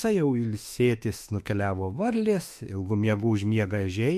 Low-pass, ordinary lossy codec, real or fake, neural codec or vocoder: 14.4 kHz; MP3, 64 kbps; fake; autoencoder, 48 kHz, 32 numbers a frame, DAC-VAE, trained on Japanese speech